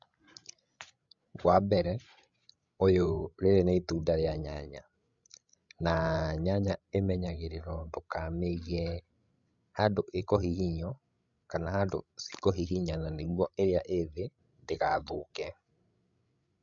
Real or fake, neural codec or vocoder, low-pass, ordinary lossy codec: fake; codec, 16 kHz, 16 kbps, FreqCodec, larger model; 7.2 kHz; MP3, 64 kbps